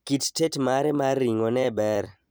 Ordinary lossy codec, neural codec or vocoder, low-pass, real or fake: none; vocoder, 44.1 kHz, 128 mel bands every 256 samples, BigVGAN v2; none; fake